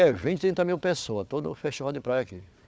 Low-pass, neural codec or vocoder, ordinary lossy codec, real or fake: none; codec, 16 kHz, 4 kbps, FunCodec, trained on Chinese and English, 50 frames a second; none; fake